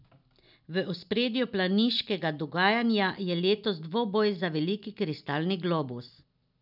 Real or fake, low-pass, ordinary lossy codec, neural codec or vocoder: real; 5.4 kHz; none; none